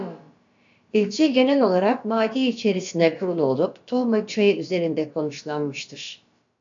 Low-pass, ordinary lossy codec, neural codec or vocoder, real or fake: 7.2 kHz; AAC, 64 kbps; codec, 16 kHz, about 1 kbps, DyCAST, with the encoder's durations; fake